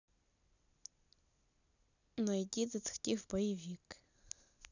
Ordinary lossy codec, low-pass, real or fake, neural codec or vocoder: none; 7.2 kHz; real; none